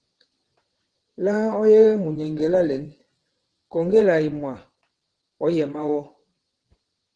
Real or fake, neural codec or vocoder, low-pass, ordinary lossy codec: fake; vocoder, 22.05 kHz, 80 mel bands, WaveNeXt; 9.9 kHz; Opus, 16 kbps